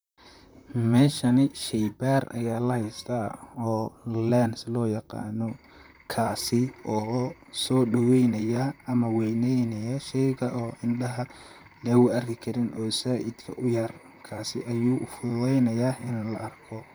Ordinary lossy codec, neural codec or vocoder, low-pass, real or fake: none; vocoder, 44.1 kHz, 128 mel bands, Pupu-Vocoder; none; fake